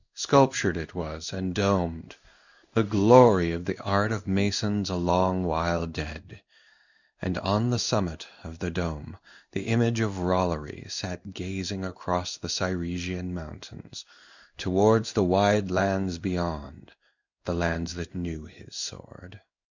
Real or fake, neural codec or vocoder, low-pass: fake; codec, 16 kHz in and 24 kHz out, 1 kbps, XY-Tokenizer; 7.2 kHz